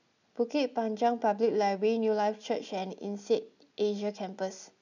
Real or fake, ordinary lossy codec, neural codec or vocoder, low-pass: real; none; none; 7.2 kHz